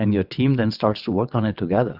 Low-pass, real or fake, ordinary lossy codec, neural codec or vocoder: 5.4 kHz; fake; Opus, 64 kbps; vocoder, 22.05 kHz, 80 mel bands, WaveNeXt